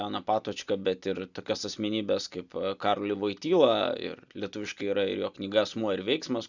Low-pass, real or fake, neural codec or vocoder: 7.2 kHz; real; none